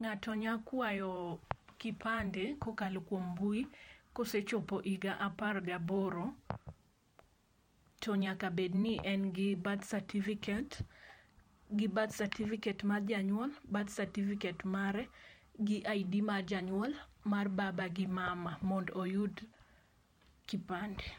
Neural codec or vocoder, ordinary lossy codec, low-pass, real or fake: vocoder, 44.1 kHz, 128 mel bands every 512 samples, BigVGAN v2; MP3, 64 kbps; 19.8 kHz; fake